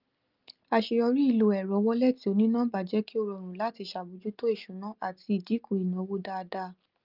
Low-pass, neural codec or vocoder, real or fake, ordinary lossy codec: 5.4 kHz; none; real; Opus, 24 kbps